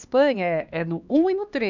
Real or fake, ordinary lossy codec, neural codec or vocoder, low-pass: fake; none; codec, 16 kHz, 2 kbps, X-Codec, HuBERT features, trained on LibriSpeech; 7.2 kHz